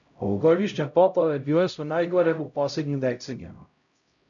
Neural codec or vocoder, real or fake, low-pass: codec, 16 kHz, 0.5 kbps, X-Codec, HuBERT features, trained on LibriSpeech; fake; 7.2 kHz